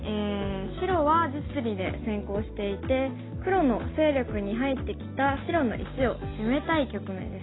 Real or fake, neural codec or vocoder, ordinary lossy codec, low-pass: real; none; AAC, 16 kbps; 7.2 kHz